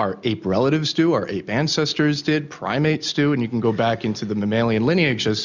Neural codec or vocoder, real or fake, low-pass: none; real; 7.2 kHz